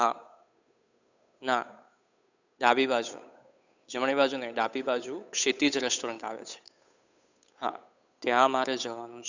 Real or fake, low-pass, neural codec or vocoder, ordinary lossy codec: fake; 7.2 kHz; codec, 16 kHz, 8 kbps, FunCodec, trained on Chinese and English, 25 frames a second; none